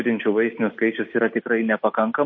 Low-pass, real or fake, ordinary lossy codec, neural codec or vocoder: 7.2 kHz; real; MP3, 32 kbps; none